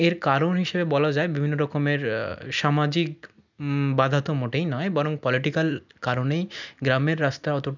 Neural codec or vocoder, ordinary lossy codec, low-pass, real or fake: none; none; 7.2 kHz; real